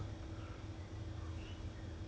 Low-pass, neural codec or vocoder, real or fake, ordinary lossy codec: none; none; real; none